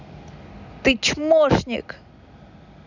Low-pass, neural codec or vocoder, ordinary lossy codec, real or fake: 7.2 kHz; none; none; real